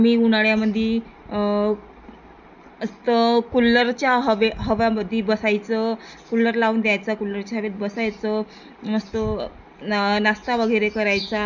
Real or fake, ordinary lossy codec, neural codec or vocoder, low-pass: real; none; none; 7.2 kHz